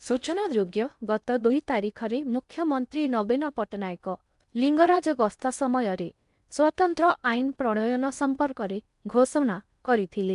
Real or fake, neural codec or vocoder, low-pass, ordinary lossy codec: fake; codec, 16 kHz in and 24 kHz out, 0.8 kbps, FocalCodec, streaming, 65536 codes; 10.8 kHz; MP3, 96 kbps